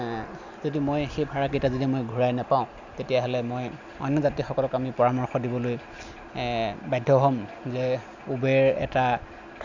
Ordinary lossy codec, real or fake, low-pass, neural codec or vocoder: none; real; 7.2 kHz; none